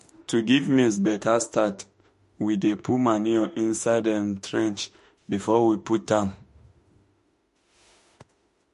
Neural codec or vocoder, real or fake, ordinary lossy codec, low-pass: autoencoder, 48 kHz, 32 numbers a frame, DAC-VAE, trained on Japanese speech; fake; MP3, 48 kbps; 14.4 kHz